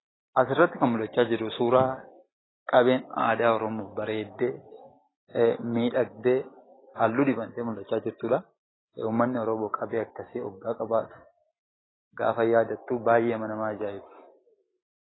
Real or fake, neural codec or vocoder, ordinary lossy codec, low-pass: real; none; AAC, 16 kbps; 7.2 kHz